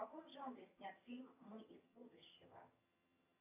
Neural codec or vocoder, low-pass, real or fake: vocoder, 22.05 kHz, 80 mel bands, HiFi-GAN; 3.6 kHz; fake